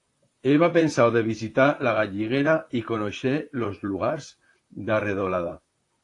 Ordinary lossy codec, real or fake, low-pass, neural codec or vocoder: AAC, 48 kbps; fake; 10.8 kHz; vocoder, 44.1 kHz, 128 mel bands, Pupu-Vocoder